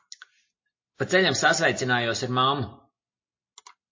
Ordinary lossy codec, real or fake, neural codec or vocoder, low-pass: MP3, 32 kbps; real; none; 7.2 kHz